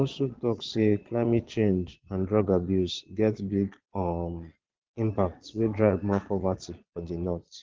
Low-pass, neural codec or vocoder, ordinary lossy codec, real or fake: 7.2 kHz; vocoder, 22.05 kHz, 80 mel bands, Vocos; Opus, 16 kbps; fake